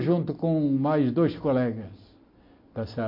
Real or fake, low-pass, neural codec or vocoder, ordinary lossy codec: real; 5.4 kHz; none; MP3, 32 kbps